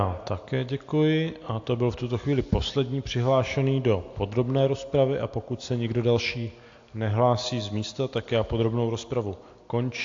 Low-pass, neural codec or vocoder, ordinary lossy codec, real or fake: 7.2 kHz; none; AAC, 48 kbps; real